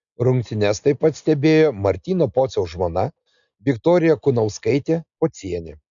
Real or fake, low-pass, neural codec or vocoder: real; 7.2 kHz; none